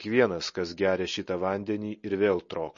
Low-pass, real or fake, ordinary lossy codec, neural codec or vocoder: 7.2 kHz; real; MP3, 32 kbps; none